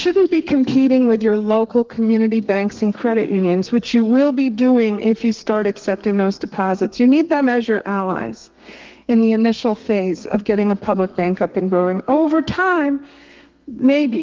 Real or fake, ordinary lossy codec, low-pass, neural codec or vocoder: fake; Opus, 32 kbps; 7.2 kHz; codec, 32 kHz, 1.9 kbps, SNAC